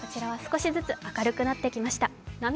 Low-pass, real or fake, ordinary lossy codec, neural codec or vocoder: none; real; none; none